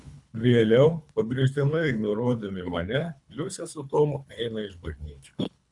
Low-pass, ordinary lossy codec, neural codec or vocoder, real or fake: 10.8 kHz; MP3, 96 kbps; codec, 24 kHz, 3 kbps, HILCodec; fake